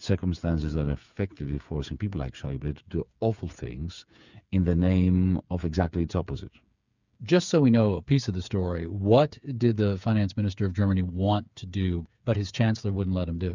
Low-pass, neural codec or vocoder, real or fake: 7.2 kHz; codec, 16 kHz, 8 kbps, FreqCodec, smaller model; fake